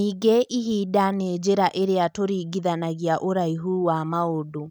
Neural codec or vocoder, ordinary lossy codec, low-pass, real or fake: none; none; none; real